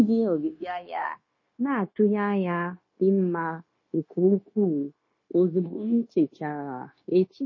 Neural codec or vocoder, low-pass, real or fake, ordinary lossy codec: codec, 16 kHz, 0.9 kbps, LongCat-Audio-Codec; 7.2 kHz; fake; MP3, 32 kbps